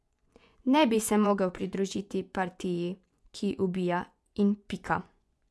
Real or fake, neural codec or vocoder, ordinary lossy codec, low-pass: fake; vocoder, 24 kHz, 100 mel bands, Vocos; none; none